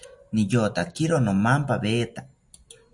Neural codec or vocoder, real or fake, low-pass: none; real; 10.8 kHz